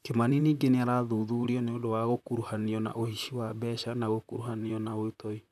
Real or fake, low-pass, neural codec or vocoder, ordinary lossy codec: fake; 14.4 kHz; vocoder, 44.1 kHz, 128 mel bands, Pupu-Vocoder; none